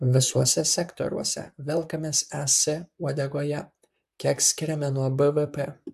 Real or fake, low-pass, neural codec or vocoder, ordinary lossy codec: fake; 14.4 kHz; vocoder, 44.1 kHz, 128 mel bands, Pupu-Vocoder; AAC, 96 kbps